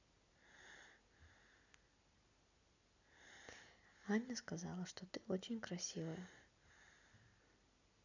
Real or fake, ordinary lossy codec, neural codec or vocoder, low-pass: real; none; none; 7.2 kHz